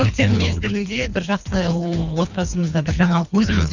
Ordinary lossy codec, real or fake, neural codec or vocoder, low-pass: none; fake; codec, 24 kHz, 3 kbps, HILCodec; 7.2 kHz